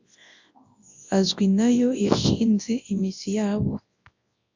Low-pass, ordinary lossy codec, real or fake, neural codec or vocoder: 7.2 kHz; AAC, 48 kbps; fake; codec, 24 kHz, 0.9 kbps, WavTokenizer, large speech release